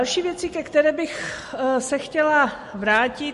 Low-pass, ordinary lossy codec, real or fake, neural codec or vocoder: 14.4 kHz; MP3, 48 kbps; real; none